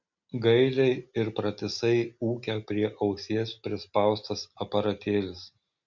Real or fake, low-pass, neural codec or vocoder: real; 7.2 kHz; none